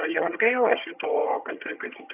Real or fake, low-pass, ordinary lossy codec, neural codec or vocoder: fake; 3.6 kHz; AAC, 32 kbps; vocoder, 22.05 kHz, 80 mel bands, HiFi-GAN